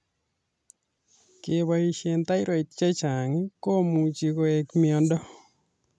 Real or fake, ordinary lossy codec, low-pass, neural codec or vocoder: real; none; none; none